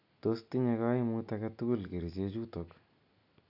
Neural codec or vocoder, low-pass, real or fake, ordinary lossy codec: none; 5.4 kHz; real; none